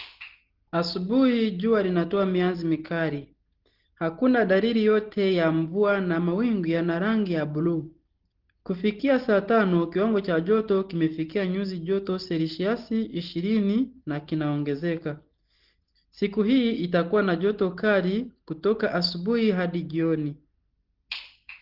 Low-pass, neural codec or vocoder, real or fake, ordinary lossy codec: 5.4 kHz; none; real; Opus, 16 kbps